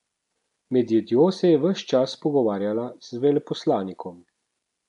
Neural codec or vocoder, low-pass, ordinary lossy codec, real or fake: none; 10.8 kHz; none; real